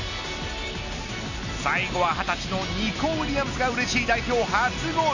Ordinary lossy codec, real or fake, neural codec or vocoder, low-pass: none; real; none; 7.2 kHz